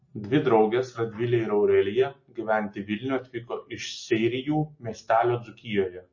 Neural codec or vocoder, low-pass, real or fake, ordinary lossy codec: none; 7.2 kHz; real; MP3, 32 kbps